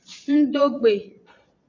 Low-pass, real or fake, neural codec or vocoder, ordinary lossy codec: 7.2 kHz; fake; vocoder, 22.05 kHz, 80 mel bands, Vocos; MP3, 64 kbps